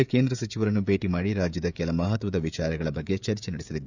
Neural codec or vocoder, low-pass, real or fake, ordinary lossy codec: codec, 16 kHz, 16 kbps, FreqCodec, smaller model; 7.2 kHz; fake; none